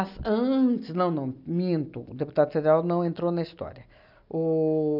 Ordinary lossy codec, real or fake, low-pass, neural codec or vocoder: none; real; 5.4 kHz; none